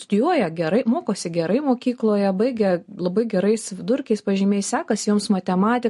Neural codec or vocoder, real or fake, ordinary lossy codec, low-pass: none; real; MP3, 48 kbps; 14.4 kHz